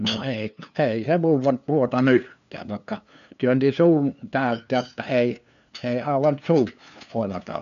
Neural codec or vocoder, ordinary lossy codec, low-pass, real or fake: codec, 16 kHz, 2 kbps, FunCodec, trained on LibriTTS, 25 frames a second; none; 7.2 kHz; fake